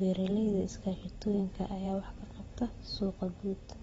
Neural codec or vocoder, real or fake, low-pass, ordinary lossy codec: vocoder, 44.1 kHz, 128 mel bands every 512 samples, BigVGAN v2; fake; 19.8 kHz; AAC, 24 kbps